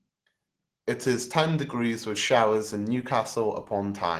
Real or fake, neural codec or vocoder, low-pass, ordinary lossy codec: real; none; 14.4 kHz; Opus, 16 kbps